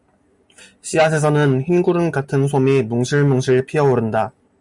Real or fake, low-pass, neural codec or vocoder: real; 10.8 kHz; none